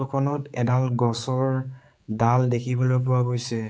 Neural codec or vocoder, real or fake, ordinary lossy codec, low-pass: codec, 16 kHz, 4 kbps, X-Codec, HuBERT features, trained on general audio; fake; none; none